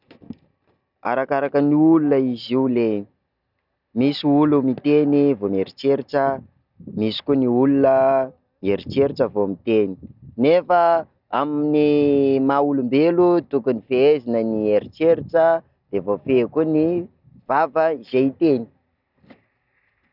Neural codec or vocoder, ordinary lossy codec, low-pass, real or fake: none; none; 5.4 kHz; real